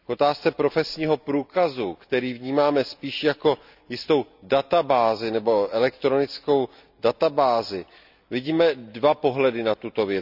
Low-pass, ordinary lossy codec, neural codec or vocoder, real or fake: 5.4 kHz; none; none; real